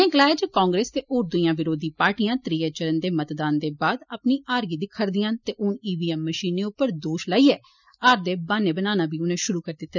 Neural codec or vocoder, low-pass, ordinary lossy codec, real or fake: none; 7.2 kHz; none; real